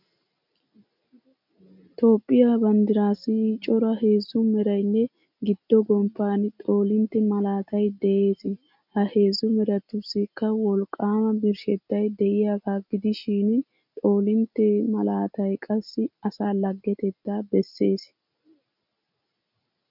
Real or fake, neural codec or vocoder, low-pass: real; none; 5.4 kHz